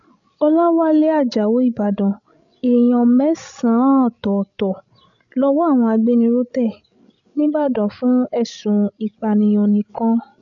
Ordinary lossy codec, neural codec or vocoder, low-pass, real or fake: none; codec, 16 kHz, 16 kbps, FreqCodec, larger model; 7.2 kHz; fake